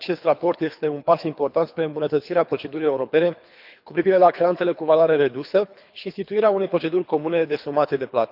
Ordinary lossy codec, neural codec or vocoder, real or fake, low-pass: none; codec, 24 kHz, 3 kbps, HILCodec; fake; 5.4 kHz